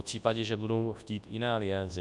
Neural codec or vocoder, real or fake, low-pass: codec, 24 kHz, 0.9 kbps, WavTokenizer, large speech release; fake; 10.8 kHz